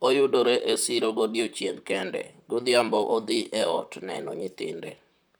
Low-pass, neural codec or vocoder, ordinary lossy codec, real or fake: none; vocoder, 44.1 kHz, 128 mel bands, Pupu-Vocoder; none; fake